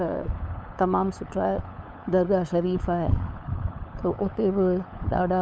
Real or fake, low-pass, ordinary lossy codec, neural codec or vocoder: fake; none; none; codec, 16 kHz, 8 kbps, FunCodec, trained on LibriTTS, 25 frames a second